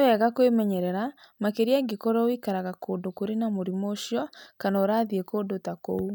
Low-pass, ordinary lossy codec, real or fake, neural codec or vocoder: none; none; real; none